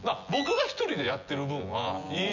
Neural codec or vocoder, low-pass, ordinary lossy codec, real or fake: vocoder, 24 kHz, 100 mel bands, Vocos; 7.2 kHz; none; fake